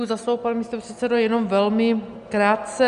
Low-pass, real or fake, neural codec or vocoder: 10.8 kHz; real; none